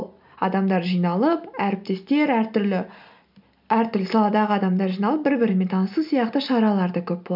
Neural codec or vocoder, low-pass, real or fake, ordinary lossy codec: none; 5.4 kHz; real; none